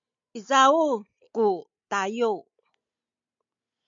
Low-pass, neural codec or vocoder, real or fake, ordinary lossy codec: 7.2 kHz; none; real; MP3, 64 kbps